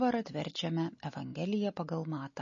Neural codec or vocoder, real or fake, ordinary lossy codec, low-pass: none; real; MP3, 32 kbps; 7.2 kHz